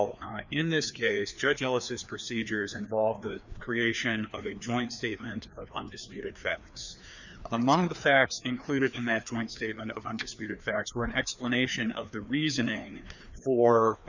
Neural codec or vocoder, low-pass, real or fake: codec, 16 kHz, 2 kbps, FreqCodec, larger model; 7.2 kHz; fake